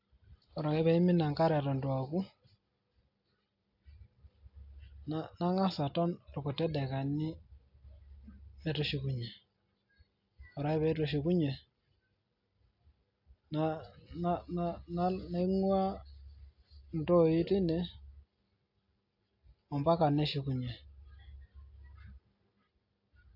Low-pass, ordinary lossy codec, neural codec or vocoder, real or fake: 5.4 kHz; AAC, 48 kbps; none; real